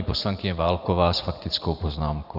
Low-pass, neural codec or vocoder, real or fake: 5.4 kHz; vocoder, 44.1 kHz, 80 mel bands, Vocos; fake